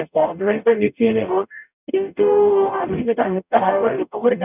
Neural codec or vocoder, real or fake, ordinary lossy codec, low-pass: codec, 44.1 kHz, 0.9 kbps, DAC; fake; none; 3.6 kHz